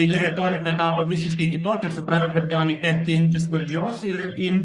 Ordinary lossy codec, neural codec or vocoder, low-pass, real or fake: Opus, 64 kbps; codec, 44.1 kHz, 1.7 kbps, Pupu-Codec; 10.8 kHz; fake